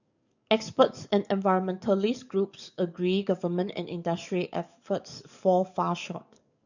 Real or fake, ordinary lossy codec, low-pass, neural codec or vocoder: fake; none; 7.2 kHz; codec, 44.1 kHz, 7.8 kbps, DAC